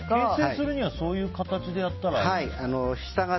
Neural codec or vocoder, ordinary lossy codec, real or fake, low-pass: none; MP3, 24 kbps; real; 7.2 kHz